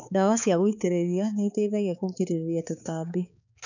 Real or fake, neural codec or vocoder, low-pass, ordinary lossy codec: fake; codec, 16 kHz, 4 kbps, X-Codec, HuBERT features, trained on balanced general audio; 7.2 kHz; none